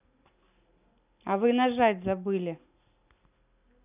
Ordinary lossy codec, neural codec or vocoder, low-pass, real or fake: none; none; 3.6 kHz; real